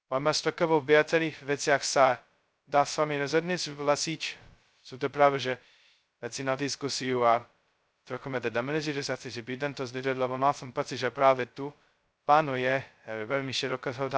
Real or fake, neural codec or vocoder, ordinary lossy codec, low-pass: fake; codec, 16 kHz, 0.2 kbps, FocalCodec; none; none